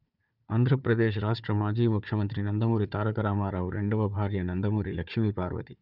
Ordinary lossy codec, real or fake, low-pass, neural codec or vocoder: none; fake; 5.4 kHz; codec, 16 kHz, 4 kbps, FunCodec, trained on Chinese and English, 50 frames a second